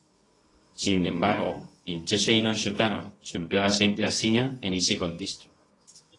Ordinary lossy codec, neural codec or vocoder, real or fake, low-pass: AAC, 32 kbps; codec, 24 kHz, 0.9 kbps, WavTokenizer, medium music audio release; fake; 10.8 kHz